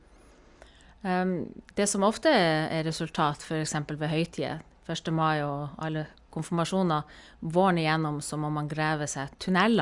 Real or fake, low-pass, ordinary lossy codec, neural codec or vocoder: real; 10.8 kHz; Opus, 64 kbps; none